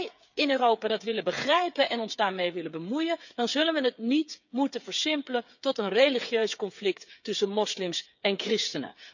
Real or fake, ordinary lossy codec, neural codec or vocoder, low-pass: fake; none; codec, 16 kHz, 8 kbps, FreqCodec, smaller model; 7.2 kHz